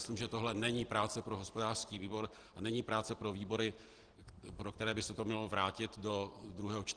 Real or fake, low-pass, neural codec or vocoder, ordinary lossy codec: real; 9.9 kHz; none; Opus, 16 kbps